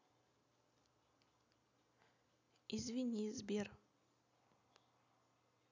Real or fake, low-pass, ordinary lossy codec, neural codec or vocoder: real; 7.2 kHz; none; none